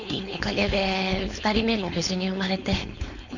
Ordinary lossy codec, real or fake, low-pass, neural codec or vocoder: none; fake; 7.2 kHz; codec, 16 kHz, 4.8 kbps, FACodec